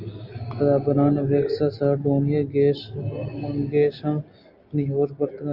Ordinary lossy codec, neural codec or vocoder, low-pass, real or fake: Opus, 24 kbps; none; 5.4 kHz; real